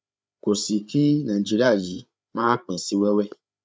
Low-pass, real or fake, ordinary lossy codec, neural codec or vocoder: none; fake; none; codec, 16 kHz, 8 kbps, FreqCodec, larger model